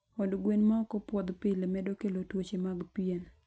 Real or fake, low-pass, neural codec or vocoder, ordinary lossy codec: real; none; none; none